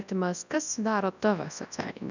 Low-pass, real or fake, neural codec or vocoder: 7.2 kHz; fake; codec, 24 kHz, 0.9 kbps, WavTokenizer, large speech release